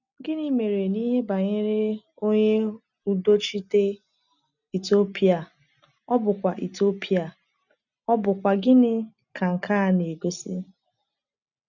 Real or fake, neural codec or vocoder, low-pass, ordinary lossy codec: real; none; 7.2 kHz; none